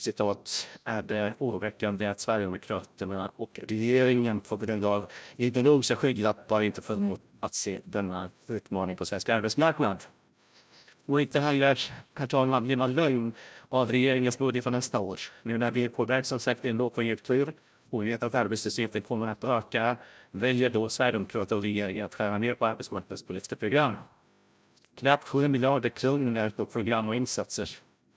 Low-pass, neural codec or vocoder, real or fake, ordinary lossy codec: none; codec, 16 kHz, 0.5 kbps, FreqCodec, larger model; fake; none